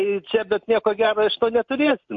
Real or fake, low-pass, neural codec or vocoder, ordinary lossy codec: real; 7.2 kHz; none; MP3, 48 kbps